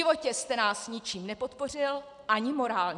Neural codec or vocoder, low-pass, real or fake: none; 10.8 kHz; real